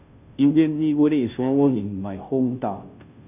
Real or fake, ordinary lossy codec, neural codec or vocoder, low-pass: fake; none; codec, 16 kHz, 0.5 kbps, FunCodec, trained on Chinese and English, 25 frames a second; 3.6 kHz